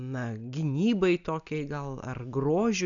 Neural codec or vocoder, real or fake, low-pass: none; real; 7.2 kHz